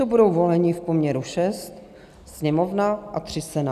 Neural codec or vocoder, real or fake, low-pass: none; real; 14.4 kHz